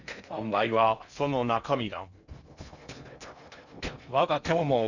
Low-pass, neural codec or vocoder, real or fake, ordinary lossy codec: 7.2 kHz; codec, 16 kHz in and 24 kHz out, 0.6 kbps, FocalCodec, streaming, 2048 codes; fake; none